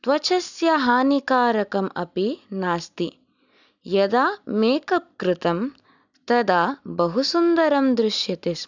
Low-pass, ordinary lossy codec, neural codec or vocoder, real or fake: 7.2 kHz; none; none; real